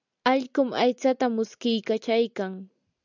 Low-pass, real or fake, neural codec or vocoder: 7.2 kHz; real; none